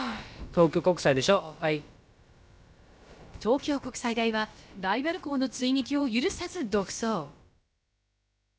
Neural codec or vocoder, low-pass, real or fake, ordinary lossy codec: codec, 16 kHz, about 1 kbps, DyCAST, with the encoder's durations; none; fake; none